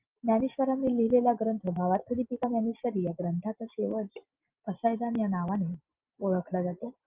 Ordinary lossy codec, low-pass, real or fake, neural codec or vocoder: Opus, 24 kbps; 3.6 kHz; real; none